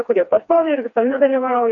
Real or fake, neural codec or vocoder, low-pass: fake; codec, 16 kHz, 2 kbps, FreqCodec, smaller model; 7.2 kHz